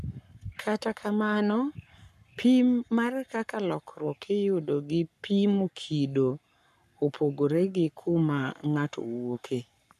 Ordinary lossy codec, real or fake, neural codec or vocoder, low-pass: none; fake; codec, 44.1 kHz, 7.8 kbps, Pupu-Codec; 14.4 kHz